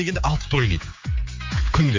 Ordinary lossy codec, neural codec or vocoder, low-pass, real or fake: MP3, 48 kbps; codec, 16 kHz, 4 kbps, X-Codec, HuBERT features, trained on general audio; 7.2 kHz; fake